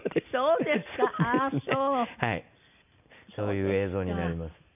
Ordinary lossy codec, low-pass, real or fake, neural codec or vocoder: none; 3.6 kHz; real; none